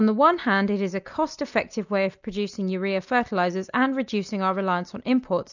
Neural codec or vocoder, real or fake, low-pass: none; real; 7.2 kHz